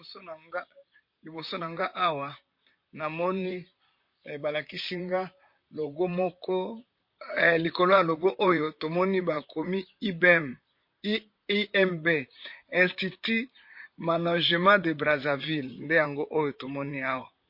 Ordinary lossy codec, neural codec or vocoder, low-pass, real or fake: MP3, 32 kbps; vocoder, 44.1 kHz, 128 mel bands, Pupu-Vocoder; 5.4 kHz; fake